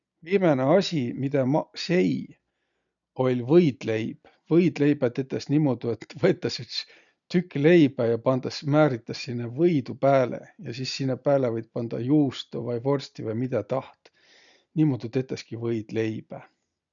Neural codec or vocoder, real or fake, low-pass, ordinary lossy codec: none; real; 7.2 kHz; none